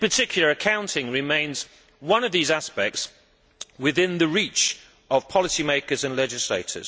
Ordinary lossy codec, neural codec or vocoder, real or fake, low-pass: none; none; real; none